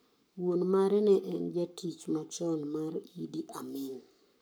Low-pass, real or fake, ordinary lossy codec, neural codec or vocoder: none; fake; none; codec, 44.1 kHz, 7.8 kbps, Pupu-Codec